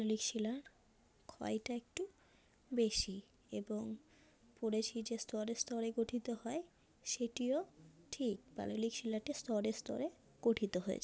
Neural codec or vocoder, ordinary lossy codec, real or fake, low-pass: none; none; real; none